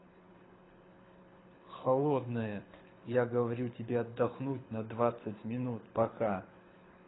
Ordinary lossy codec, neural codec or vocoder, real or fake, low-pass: AAC, 16 kbps; codec, 24 kHz, 6 kbps, HILCodec; fake; 7.2 kHz